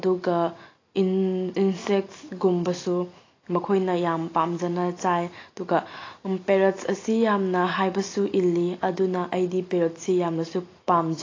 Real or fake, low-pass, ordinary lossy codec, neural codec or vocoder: real; 7.2 kHz; AAC, 32 kbps; none